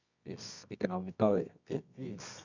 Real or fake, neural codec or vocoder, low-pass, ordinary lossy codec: fake; codec, 24 kHz, 0.9 kbps, WavTokenizer, medium music audio release; 7.2 kHz; none